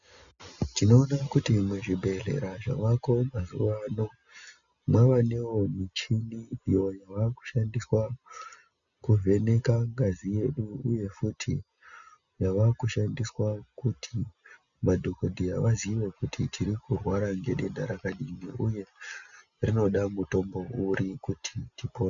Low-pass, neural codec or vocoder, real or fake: 7.2 kHz; none; real